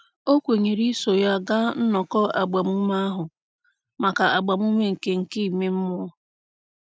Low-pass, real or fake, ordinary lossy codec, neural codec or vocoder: none; real; none; none